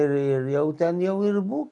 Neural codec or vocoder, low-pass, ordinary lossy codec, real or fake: none; 10.8 kHz; MP3, 64 kbps; real